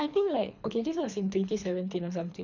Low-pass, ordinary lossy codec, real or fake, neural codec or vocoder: 7.2 kHz; none; fake; codec, 24 kHz, 3 kbps, HILCodec